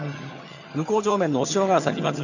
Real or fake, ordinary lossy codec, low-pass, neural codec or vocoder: fake; none; 7.2 kHz; vocoder, 22.05 kHz, 80 mel bands, HiFi-GAN